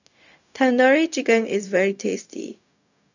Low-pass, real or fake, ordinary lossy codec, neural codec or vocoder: 7.2 kHz; fake; none; codec, 16 kHz, 0.4 kbps, LongCat-Audio-Codec